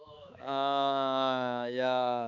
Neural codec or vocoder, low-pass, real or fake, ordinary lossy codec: codec, 16 kHz, 4 kbps, X-Codec, HuBERT features, trained on balanced general audio; 7.2 kHz; fake; MP3, 64 kbps